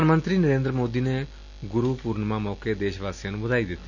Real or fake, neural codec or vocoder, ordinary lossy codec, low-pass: real; none; none; 7.2 kHz